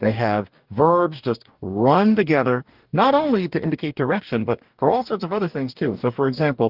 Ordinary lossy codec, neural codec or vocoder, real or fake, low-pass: Opus, 32 kbps; codec, 44.1 kHz, 2.6 kbps, DAC; fake; 5.4 kHz